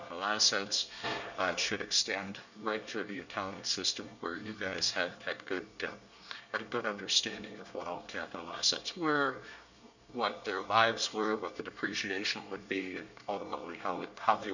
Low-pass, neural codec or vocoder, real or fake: 7.2 kHz; codec, 24 kHz, 1 kbps, SNAC; fake